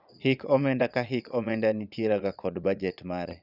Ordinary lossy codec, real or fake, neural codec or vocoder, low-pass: none; fake; vocoder, 22.05 kHz, 80 mel bands, Vocos; 5.4 kHz